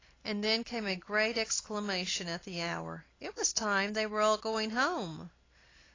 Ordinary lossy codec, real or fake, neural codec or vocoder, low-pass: AAC, 32 kbps; real; none; 7.2 kHz